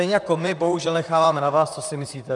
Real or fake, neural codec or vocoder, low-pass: fake; vocoder, 44.1 kHz, 128 mel bands, Pupu-Vocoder; 10.8 kHz